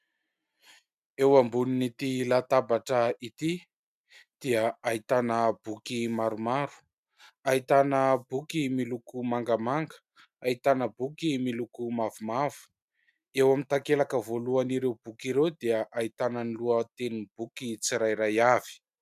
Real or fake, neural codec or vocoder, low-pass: real; none; 14.4 kHz